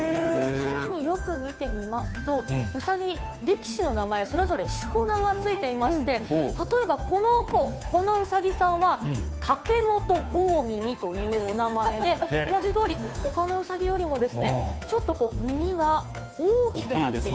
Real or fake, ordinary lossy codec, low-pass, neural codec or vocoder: fake; none; none; codec, 16 kHz, 2 kbps, FunCodec, trained on Chinese and English, 25 frames a second